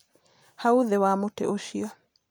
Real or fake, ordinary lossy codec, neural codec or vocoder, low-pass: real; none; none; none